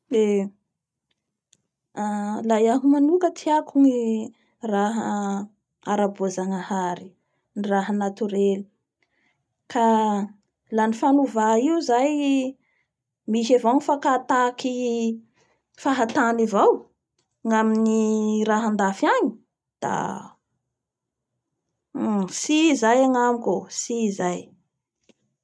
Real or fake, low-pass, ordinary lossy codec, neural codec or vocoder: real; none; none; none